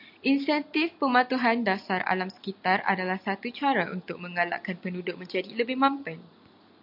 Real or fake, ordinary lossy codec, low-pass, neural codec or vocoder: real; MP3, 48 kbps; 5.4 kHz; none